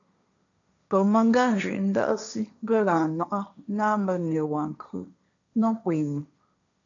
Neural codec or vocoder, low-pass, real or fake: codec, 16 kHz, 1.1 kbps, Voila-Tokenizer; 7.2 kHz; fake